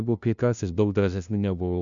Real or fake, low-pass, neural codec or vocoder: fake; 7.2 kHz; codec, 16 kHz, 0.5 kbps, FunCodec, trained on LibriTTS, 25 frames a second